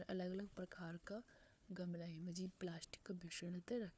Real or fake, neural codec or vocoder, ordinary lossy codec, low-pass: fake; codec, 16 kHz, 4 kbps, FunCodec, trained on Chinese and English, 50 frames a second; none; none